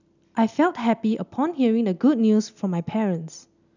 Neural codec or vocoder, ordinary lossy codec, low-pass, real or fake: none; none; 7.2 kHz; real